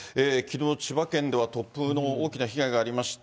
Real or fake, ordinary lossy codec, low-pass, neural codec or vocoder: real; none; none; none